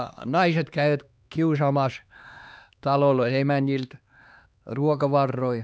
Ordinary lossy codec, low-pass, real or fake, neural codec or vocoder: none; none; fake; codec, 16 kHz, 2 kbps, X-Codec, HuBERT features, trained on LibriSpeech